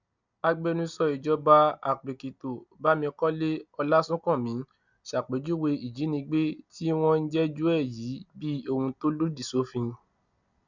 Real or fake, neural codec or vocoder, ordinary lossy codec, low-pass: real; none; none; 7.2 kHz